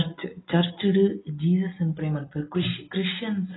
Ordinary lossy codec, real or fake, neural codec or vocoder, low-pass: AAC, 16 kbps; real; none; 7.2 kHz